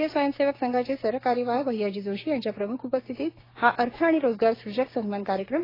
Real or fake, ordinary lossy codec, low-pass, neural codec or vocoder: fake; AAC, 24 kbps; 5.4 kHz; codec, 16 kHz, 6 kbps, DAC